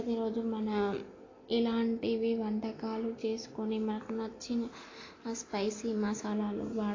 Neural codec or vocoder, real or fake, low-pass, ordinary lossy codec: none; real; 7.2 kHz; AAC, 32 kbps